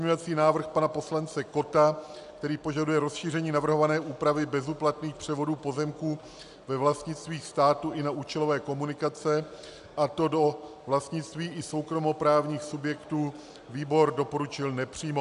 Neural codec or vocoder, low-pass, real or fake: none; 10.8 kHz; real